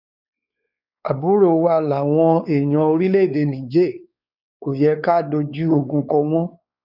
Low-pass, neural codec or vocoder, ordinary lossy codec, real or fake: 5.4 kHz; codec, 16 kHz, 4 kbps, X-Codec, WavLM features, trained on Multilingual LibriSpeech; none; fake